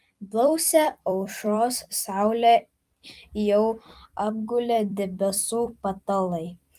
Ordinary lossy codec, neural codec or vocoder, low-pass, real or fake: Opus, 32 kbps; vocoder, 44.1 kHz, 128 mel bands every 256 samples, BigVGAN v2; 14.4 kHz; fake